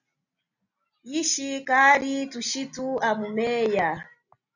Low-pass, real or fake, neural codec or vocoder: 7.2 kHz; real; none